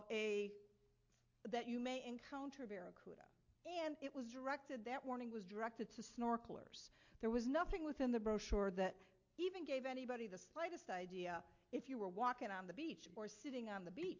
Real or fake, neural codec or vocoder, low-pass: real; none; 7.2 kHz